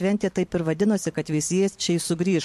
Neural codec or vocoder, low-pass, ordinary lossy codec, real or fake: vocoder, 44.1 kHz, 128 mel bands every 512 samples, BigVGAN v2; 14.4 kHz; MP3, 64 kbps; fake